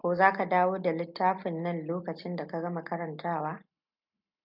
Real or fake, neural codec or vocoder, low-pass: real; none; 5.4 kHz